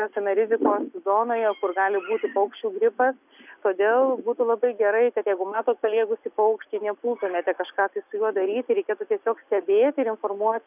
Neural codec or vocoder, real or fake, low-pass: none; real; 3.6 kHz